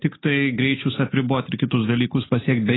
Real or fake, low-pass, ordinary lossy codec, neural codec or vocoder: real; 7.2 kHz; AAC, 16 kbps; none